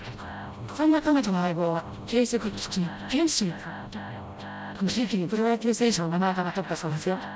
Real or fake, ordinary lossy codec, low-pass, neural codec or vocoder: fake; none; none; codec, 16 kHz, 0.5 kbps, FreqCodec, smaller model